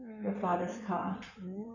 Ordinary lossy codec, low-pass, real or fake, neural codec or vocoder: none; 7.2 kHz; fake; codec, 16 kHz in and 24 kHz out, 2.2 kbps, FireRedTTS-2 codec